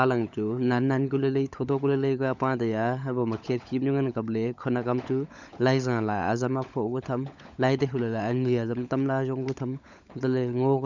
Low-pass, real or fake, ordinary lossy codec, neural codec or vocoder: 7.2 kHz; fake; none; codec, 16 kHz, 16 kbps, FunCodec, trained on LibriTTS, 50 frames a second